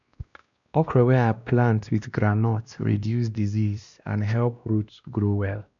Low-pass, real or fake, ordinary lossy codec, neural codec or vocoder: 7.2 kHz; fake; none; codec, 16 kHz, 1 kbps, X-Codec, WavLM features, trained on Multilingual LibriSpeech